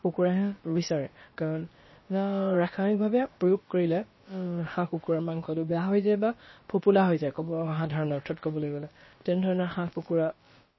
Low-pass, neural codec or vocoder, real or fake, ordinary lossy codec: 7.2 kHz; codec, 16 kHz, about 1 kbps, DyCAST, with the encoder's durations; fake; MP3, 24 kbps